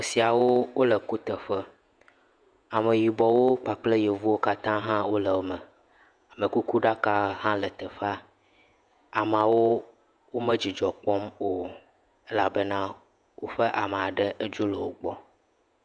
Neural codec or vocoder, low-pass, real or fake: vocoder, 48 kHz, 128 mel bands, Vocos; 9.9 kHz; fake